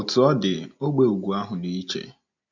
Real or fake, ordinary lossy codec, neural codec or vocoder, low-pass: real; none; none; 7.2 kHz